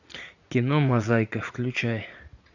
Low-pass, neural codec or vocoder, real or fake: 7.2 kHz; vocoder, 44.1 kHz, 80 mel bands, Vocos; fake